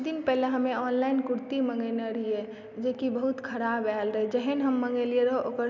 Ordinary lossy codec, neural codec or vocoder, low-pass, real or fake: none; none; 7.2 kHz; real